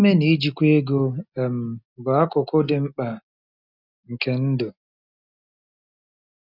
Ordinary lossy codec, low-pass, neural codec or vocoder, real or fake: none; 5.4 kHz; none; real